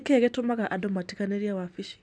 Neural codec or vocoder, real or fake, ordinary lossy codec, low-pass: none; real; none; none